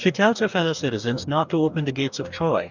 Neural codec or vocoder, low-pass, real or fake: codec, 44.1 kHz, 2.6 kbps, DAC; 7.2 kHz; fake